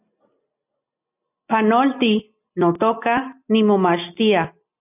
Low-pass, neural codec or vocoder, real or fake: 3.6 kHz; none; real